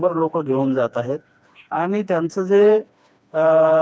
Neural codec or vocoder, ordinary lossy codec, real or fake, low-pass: codec, 16 kHz, 2 kbps, FreqCodec, smaller model; none; fake; none